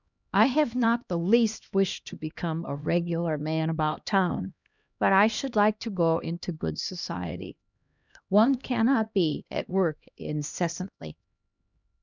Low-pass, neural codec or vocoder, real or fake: 7.2 kHz; codec, 16 kHz, 1 kbps, X-Codec, HuBERT features, trained on LibriSpeech; fake